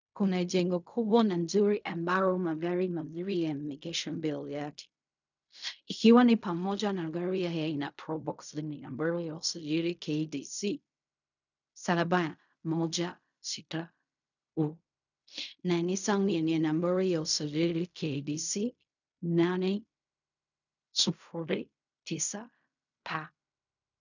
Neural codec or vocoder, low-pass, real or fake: codec, 16 kHz in and 24 kHz out, 0.4 kbps, LongCat-Audio-Codec, fine tuned four codebook decoder; 7.2 kHz; fake